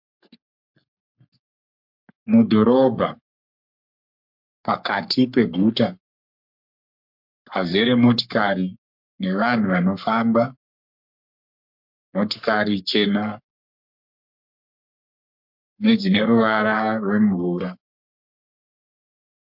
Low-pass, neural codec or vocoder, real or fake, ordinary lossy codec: 5.4 kHz; codec, 44.1 kHz, 3.4 kbps, Pupu-Codec; fake; MP3, 48 kbps